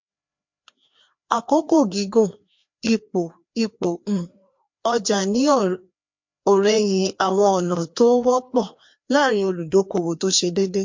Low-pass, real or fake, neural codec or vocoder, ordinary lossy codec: 7.2 kHz; fake; codec, 16 kHz, 2 kbps, FreqCodec, larger model; MP3, 48 kbps